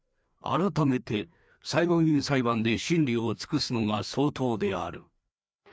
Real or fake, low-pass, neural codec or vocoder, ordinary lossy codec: fake; none; codec, 16 kHz, 2 kbps, FreqCodec, larger model; none